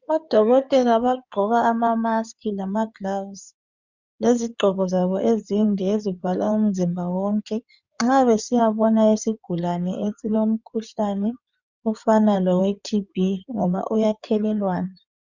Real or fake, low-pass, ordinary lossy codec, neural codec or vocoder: fake; 7.2 kHz; Opus, 64 kbps; codec, 16 kHz in and 24 kHz out, 2.2 kbps, FireRedTTS-2 codec